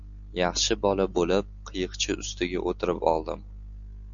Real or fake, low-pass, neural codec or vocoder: real; 7.2 kHz; none